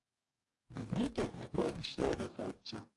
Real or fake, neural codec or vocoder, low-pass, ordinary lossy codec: fake; codec, 44.1 kHz, 2.6 kbps, DAC; 10.8 kHz; AAC, 48 kbps